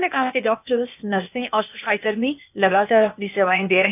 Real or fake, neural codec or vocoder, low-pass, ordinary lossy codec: fake; codec, 16 kHz, 0.8 kbps, ZipCodec; 3.6 kHz; none